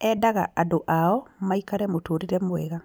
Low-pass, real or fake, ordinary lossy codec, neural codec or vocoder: none; real; none; none